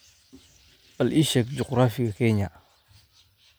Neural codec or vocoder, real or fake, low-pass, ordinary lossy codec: none; real; none; none